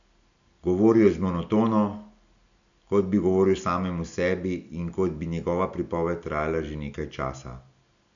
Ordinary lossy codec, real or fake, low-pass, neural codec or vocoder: none; real; 7.2 kHz; none